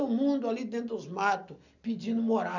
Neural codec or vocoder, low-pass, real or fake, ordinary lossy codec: none; 7.2 kHz; real; none